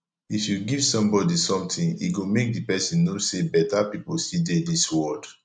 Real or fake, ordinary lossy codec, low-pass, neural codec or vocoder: real; none; 9.9 kHz; none